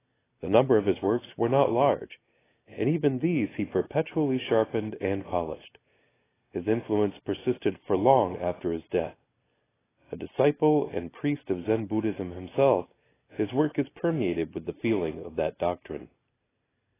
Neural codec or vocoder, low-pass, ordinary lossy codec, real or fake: none; 3.6 kHz; AAC, 16 kbps; real